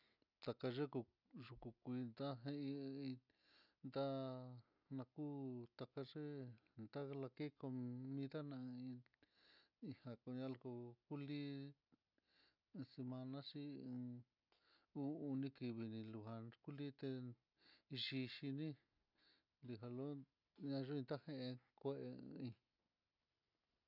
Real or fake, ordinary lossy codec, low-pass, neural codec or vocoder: real; none; 5.4 kHz; none